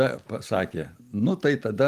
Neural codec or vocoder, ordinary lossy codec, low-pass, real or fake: none; Opus, 32 kbps; 14.4 kHz; real